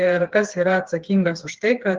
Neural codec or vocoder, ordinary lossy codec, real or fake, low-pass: codec, 16 kHz, 4 kbps, FreqCodec, smaller model; Opus, 16 kbps; fake; 7.2 kHz